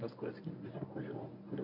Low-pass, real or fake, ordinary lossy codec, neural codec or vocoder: 5.4 kHz; fake; none; codec, 24 kHz, 0.9 kbps, WavTokenizer, medium speech release version 1